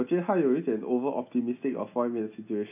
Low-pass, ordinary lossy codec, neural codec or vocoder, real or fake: 3.6 kHz; AAC, 32 kbps; none; real